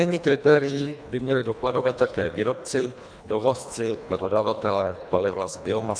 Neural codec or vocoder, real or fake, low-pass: codec, 24 kHz, 1.5 kbps, HILCodec; fake; 9.9 kHz